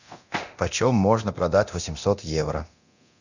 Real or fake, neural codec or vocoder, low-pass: fake; codec, 24 kHz, 0.9 kbps, DualCodec; 7.2 kHz